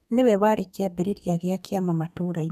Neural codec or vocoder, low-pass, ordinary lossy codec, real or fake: codec, 32 kHz, 1.9 kbps, SNAC; 14.4 kHz; none; fake